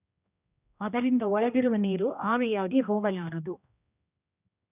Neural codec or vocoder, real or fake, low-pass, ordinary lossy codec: codec, 16 kHz, 1 kbps, X-Codec, HuBERT features, trained on general audio; fake; 3.6 kHz; none